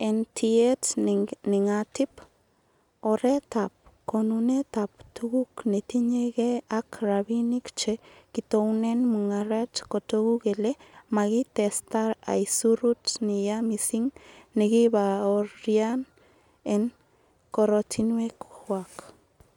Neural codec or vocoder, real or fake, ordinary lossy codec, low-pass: none; real; none; 19.8 kHz